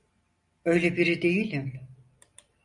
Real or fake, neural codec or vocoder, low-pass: real; none; 10.8 kHz